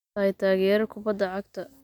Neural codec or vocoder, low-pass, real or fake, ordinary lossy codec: none; 19.8 kHz; real; none